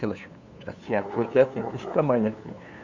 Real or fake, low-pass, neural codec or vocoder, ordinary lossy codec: fake; 7.2 kHz; codec, 16 kHz, 2 kbps, FunCodec, trained on LibriTTS, 25 frames a second; Opus, 64 kbps